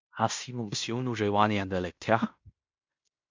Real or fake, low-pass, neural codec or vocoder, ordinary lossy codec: fake; 7.2 kHz; codec, 16 kHz in and 24 kHz out, 0.9 kbps, LongCat-Audio-Codec, fine tuned four codebook decoder; MP3, 64 kbps